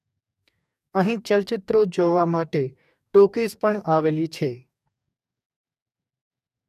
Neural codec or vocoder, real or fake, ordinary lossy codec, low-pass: codec, 44.1 kHz, 2.6 kbps, DAC; fake; none; 14.4 kHz